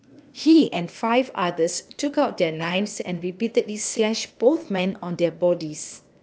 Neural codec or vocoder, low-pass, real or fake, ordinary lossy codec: codec, 16 kHz, 0.8 kbps, ZipCodec; none; fake; none